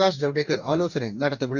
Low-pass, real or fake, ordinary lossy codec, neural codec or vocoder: 7.2 kHz; fake; none; codec, 44.1 kHz, 2.6 kbps, DAC